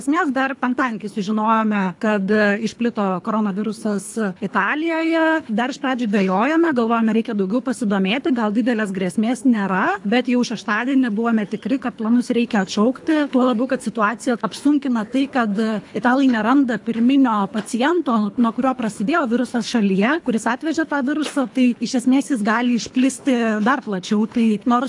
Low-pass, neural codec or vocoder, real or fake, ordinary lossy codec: 10.8 kHz; codec, 24 kHz, 3 kbps, HILCodec; fake; AAC, 64 kbps